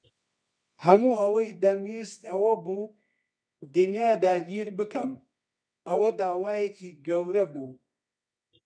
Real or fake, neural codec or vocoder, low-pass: fake; codec, 24 kHz, 0.9 kbps, WavTokenizer, medium music audio release; 9.9 kHz